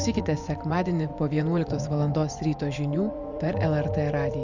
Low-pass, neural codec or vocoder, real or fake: 7.2 kHz; vocoder, 24 kHz, 100 mel bands, Vocos; fake